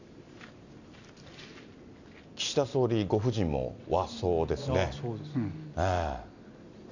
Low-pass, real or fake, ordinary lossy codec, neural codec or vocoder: 7.2 kHz; real; none; none